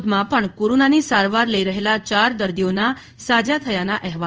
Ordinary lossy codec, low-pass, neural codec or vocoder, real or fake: Opus, 24 kbps; 7.2 kHz; codec, 16 kHz in and 24 kHz out, 1 kbps, XY-Tokenizer; fake